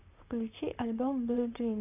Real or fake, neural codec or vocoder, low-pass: fake; vocoder, 44.1 kHz, 128 mel bands, Pupu-Vocoder; 3.6 kHz